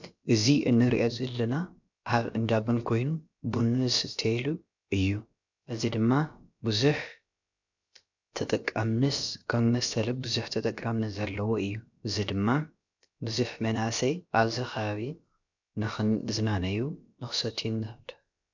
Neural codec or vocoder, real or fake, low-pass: codec, 16 kHz, about 1 kbps, DyCAST, with the encoder's durations; fake; 7.2 kHz